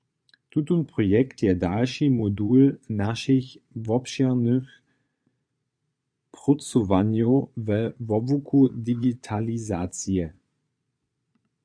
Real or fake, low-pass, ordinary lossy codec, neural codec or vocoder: fake; 9.9 kHz; AAC, 64 kbps; vocoder, 22.05 kHz, 80 mel bands, Vocos